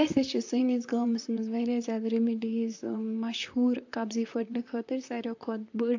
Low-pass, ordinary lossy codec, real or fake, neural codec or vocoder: 7.2 kHz; AAC, 48 kbps; fake; vocoder, 44.1 kHz, 128 mel bands, Pupu-Vocoder